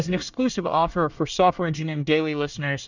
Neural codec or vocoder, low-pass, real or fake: codec, 24 kHz, 1 kbps, SNAC; 7.2 kHz; fake